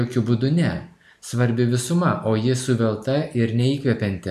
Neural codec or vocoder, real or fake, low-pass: none; real; 14.4 kHz